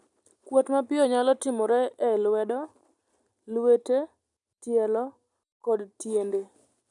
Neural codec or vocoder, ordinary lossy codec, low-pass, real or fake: none; none; 10.8 kHz; real